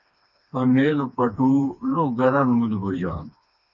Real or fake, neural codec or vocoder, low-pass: fake; codec, 16 kHz, 2 kbps, FreqCodec, smaller model; 7.2 kHz